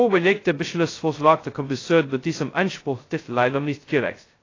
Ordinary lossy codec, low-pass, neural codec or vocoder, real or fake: AAC, 32 kbps; 7.2 kHz; codec, 16 kHz, 0.2 kbps, FocalCodec; fake